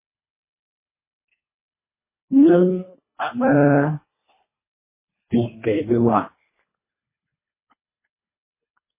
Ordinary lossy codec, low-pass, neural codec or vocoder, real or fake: MP3, 16 kbps; 3.6 kHz; codec, 24 kHz, 1.5 kbps, HILCodec; fake